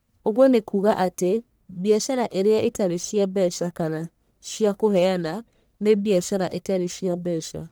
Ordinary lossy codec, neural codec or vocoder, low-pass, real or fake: none; codec, 44.1 kHz, 1.7 kbps, Pupu-Codec; none; fake